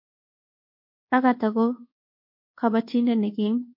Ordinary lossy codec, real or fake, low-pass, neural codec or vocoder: MP3, 32 kbps; fake; 5.4 kHz; codec, 24 kHz, 1.2 kbps, DualCodec